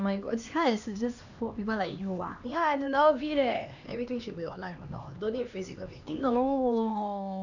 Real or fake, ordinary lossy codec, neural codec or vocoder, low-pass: fake; none; codec, 16 kHz, 2 kbps, X-Codec, HuBERT features, trained on LibriSpeech; 7.2 kHz